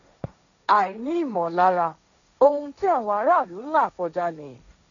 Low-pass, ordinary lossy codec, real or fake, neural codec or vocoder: 7.2 kHz; none; fake; codec, 16 kHz, 1.1 kbps, Voila-Tokenizer